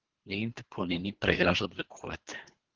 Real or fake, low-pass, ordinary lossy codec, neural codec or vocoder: fake; 7.2 kHz; Opus, 16 kbps; codec, 24 kHz, 3 kbps, HILCodec